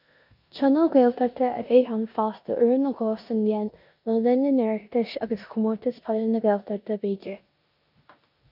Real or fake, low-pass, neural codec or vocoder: fake; 5.4 kHz; codec, 16 kHz in and 24 kHz out, 0.9 kbps, LongCat-Audio-Codec, four codebook decoder